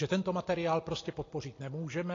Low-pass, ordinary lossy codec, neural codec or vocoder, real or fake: 7.2 kHz; AAC, 32 kbps; none; real